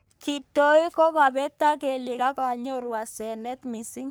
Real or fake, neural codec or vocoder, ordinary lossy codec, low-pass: fake; codec, 44.1 kHz, 3.4 kbps, Pupu-Codec; none; none